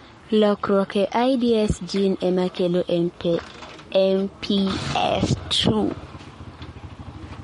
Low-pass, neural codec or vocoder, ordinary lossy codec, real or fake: 19.8 kHz; codec, 44.1 kHz, 7.8 kbps, Pupu-Codec; MP3, 48 kbps; fake